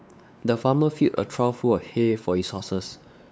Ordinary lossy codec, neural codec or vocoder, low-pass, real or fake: none; codec, 16 kHz, 4 kbps, X-Codec, WavLM features, trained on Multilingual LibriSpeech; none; fake